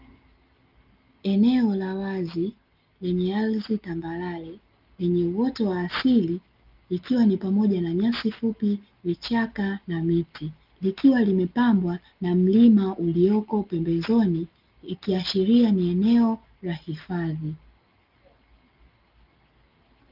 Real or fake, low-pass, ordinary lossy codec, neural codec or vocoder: real; 5.4 kHz; Opus, 24 kbps; none